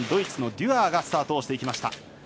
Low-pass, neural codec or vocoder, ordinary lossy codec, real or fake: none; none; none; real